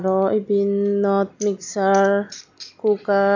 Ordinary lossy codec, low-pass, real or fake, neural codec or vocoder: none; 7.2 kHz; real; none